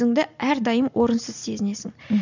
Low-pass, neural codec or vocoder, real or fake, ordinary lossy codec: 7.2 kHz; none; real; none